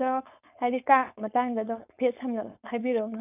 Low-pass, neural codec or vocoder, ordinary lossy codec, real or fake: 3.6 kHz; codec, 16 kHz, 4.8 kbps, FACodec; none; fake